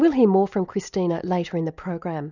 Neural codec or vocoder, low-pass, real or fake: none; 7.2 kHz; real